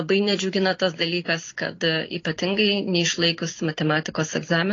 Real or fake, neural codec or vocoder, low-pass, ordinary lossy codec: real; none; 7.2 kHz; AAC, 32 kbps